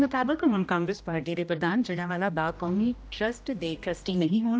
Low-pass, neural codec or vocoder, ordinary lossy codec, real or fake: none; codec, 16 kHz, 1 kbps, X-Codec, HuBERT features, trained on general audio; none; fake